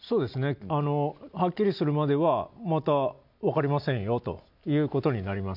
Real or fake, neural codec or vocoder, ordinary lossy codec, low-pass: real; none; none; 5.4 kHz